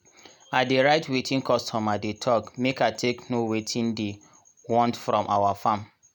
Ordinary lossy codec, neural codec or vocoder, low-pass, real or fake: none; none; none; real